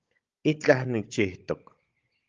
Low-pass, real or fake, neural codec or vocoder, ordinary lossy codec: 7.2 kHz; fake; codec, 16 kHz, 16 kbps, FunCodec, trained on Chinese and English, 50 frames a second; Opus, 32 kbps